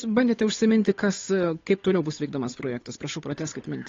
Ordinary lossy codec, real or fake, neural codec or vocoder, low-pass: AAC, 32 kbps; fake; codec, 16 kHz, 8 kbps, FunCodec, trained on Chinese and English, 25 frames a second; 7.2 kHz